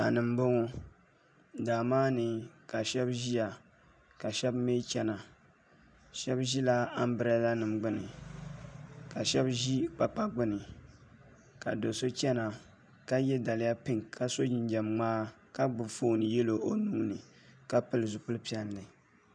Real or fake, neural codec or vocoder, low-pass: real; none; 9.9 kHz